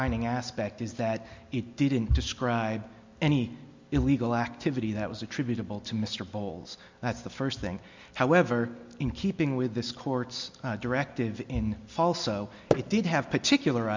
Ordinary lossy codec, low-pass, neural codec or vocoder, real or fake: MP3, 64 kbps; 7.2 kHz; none; real